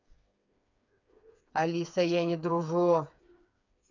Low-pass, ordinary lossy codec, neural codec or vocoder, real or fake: 7.2 kHz; none; codec, 16 kHz, 4 kbps, FreqCodec, smaller model; fake